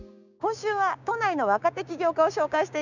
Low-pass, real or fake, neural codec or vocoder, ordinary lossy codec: 7.2 kHz; fake; codec, 16 kHz, 6 kbps, DAC; none